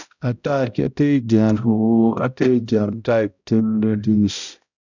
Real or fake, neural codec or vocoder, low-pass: fake; codec, 16 kHz, 0.5 kbps, X-Codec, HuBERT features, trained on balanced general audio; 7.2 kHz